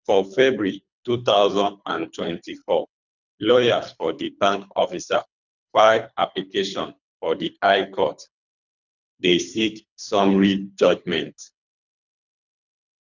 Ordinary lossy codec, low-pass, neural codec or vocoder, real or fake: none; 7.2 kHz; codec, 24 kHz, 3 kbps, HILCodec; fake